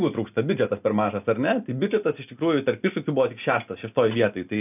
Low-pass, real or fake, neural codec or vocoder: 3.6 kHz; real; none